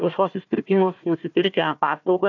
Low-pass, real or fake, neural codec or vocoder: 7.2 kHz; fake; codec, 16 kHz, 1 kbps, FunCodec, trained on Chinese and English, 50 frames a second